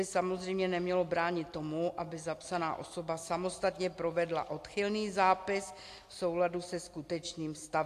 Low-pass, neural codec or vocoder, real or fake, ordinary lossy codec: 14.4 kHz; none; real; AAC, 64 kbps